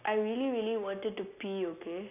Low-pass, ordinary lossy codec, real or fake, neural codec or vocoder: 3.6 kHz; none; real; none